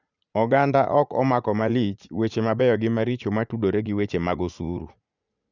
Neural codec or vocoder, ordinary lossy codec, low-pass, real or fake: vocoder, 44.1 kHz, 80 mel bands, Vocos; none; 7.2 kHz; fake